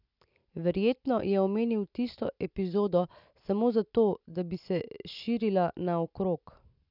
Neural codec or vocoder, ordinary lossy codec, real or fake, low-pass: none; none; real; 5.4 kHz